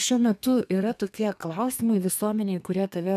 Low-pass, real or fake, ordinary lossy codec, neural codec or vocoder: 14.4 kHz; fake; AAC, 96 kbps; codec, 32 kHz, 1.9 kbps, SNAC